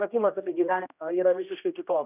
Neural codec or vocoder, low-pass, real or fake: codec, 16 kHz, 1 kbps, X-Codec, HuBERT features, trained on general audio; 3.6 kHz; fake